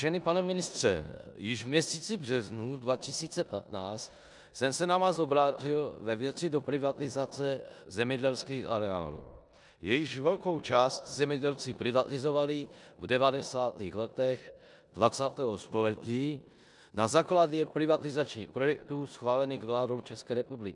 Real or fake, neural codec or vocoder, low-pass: fake; codec, 16 kHz in and 24 kHz out, 0.9 kbps, LongCat-Audio-Codec, four codebook decoder; 10.8 kHz